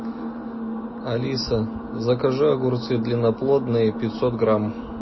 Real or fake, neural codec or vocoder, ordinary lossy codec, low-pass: real; none; MP3, 24 kbps; 7.2 kHz